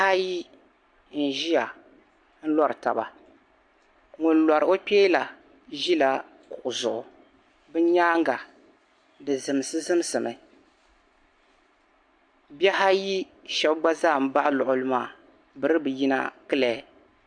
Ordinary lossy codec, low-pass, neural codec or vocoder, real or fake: AAC, 64 kbps; 9.9 kHz; none; real